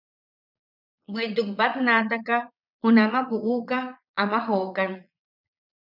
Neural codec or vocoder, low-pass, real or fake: vocoder, 22.05 kHz, 80 mel bands, Vocos; 5.4 kHz; fake